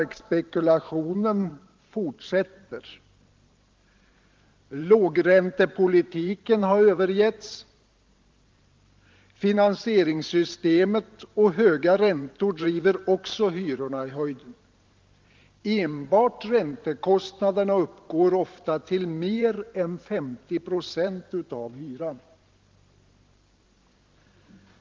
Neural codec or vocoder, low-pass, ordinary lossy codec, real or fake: vocoder, 44.1 kHz, 128 mel bands every 512 samples, BigVGAN v2; 7.2 kHz; Opus, 32 kbps; fake